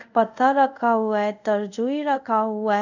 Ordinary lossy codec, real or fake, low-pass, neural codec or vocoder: none; fake; 7.2 kHz; codec, 24 kHz, 0.5 kbps, DualCodec